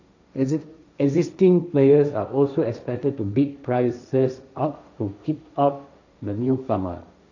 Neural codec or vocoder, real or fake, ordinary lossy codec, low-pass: codec, 16 kHz, 1.1 kbps, Voila-Tokenizer; fake; none; 7.2 kHz